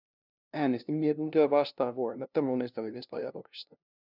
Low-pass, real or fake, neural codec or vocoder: 5.4 kHz; fake; codec, 16 kHz, 0.5 kbps, FunCodec, trained on LibriTTS, 25 frames a second